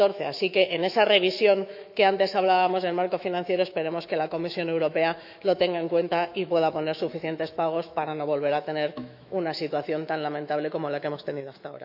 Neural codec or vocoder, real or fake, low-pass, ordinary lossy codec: autoencoder, 48 kHz, 128 numbers a frame, DAC-VAE, trained on Japanese speech; fake; 5.4 kHz; none